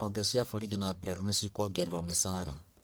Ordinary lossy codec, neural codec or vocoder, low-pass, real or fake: none; codec, 44.1 kHz, 1.7 kbps, Pupu-Codec; none; fake